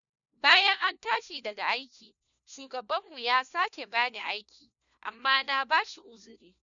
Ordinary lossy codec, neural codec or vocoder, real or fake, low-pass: none; codec, 16 kHz, 1 kbps, FunCodec, trained on LibriTTS, 50 frames a second; fake; 7.2 kHz